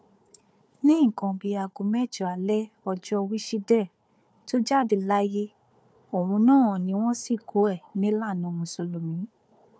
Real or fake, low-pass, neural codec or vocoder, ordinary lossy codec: fake; none; codec, 16 kHz, 4 kbps, FunCodec, trained on Chinese and English, 50 frames a second; none